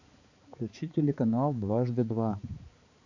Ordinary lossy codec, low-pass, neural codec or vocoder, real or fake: AAC, 48 kbps; 7.2 kHz; codec, 16 kHz, 4 kbps, X-Codec, HuBERT features, trained on balanced general audio; fake